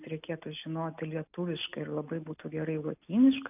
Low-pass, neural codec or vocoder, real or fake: 3.6 kHz; none; real